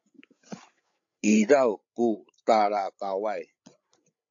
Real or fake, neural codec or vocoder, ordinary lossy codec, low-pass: fake; codec, 16 kHz, 8 kbps, FreqCodec, larger model; AAC, 64 kbps; 7.2 kHz